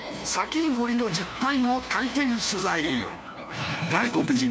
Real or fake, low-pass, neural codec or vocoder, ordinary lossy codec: fake; none; codec, 16 kHz, 1 kbps, FunCodec, trained on LibriTTS, 50 frames a second; none